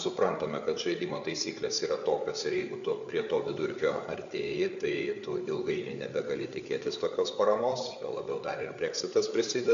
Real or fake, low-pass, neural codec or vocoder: fake; 7.2 kHz; codec, 16 kHz, 8 kbps, FreqCodec, larger model